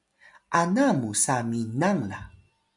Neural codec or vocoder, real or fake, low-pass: none; real; 10.8 kHz